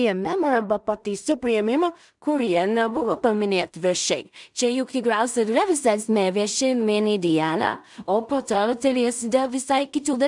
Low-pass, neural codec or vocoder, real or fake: 10.8 kHz; codec, 16 kHz in and 24 kHz out, 0.4 kbps, LongCat-Audio-Codec, two codebook decoder; fake